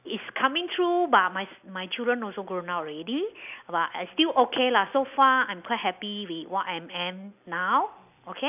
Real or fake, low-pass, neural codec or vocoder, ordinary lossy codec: real; 3.6 kHz; none; none